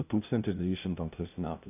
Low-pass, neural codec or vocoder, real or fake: 3.6 kHz; codec, 16 kHz, 0.5 kbps, FunCodec, trained on LibriTTS, 25 frames a second; fake